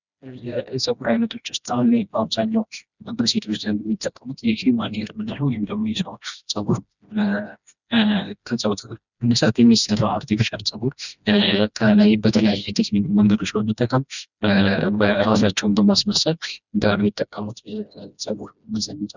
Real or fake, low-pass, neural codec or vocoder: fake; 7.2 kHz; codec, 16 kHz, 1 kbps, FreqCodec, smaller model